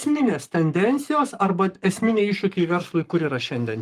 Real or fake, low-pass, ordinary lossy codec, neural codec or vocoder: fake; 14.4 kHz; Opus, 32 kbps; codec, 44.1 kHz, 7.8 kbps, Pupu-Codec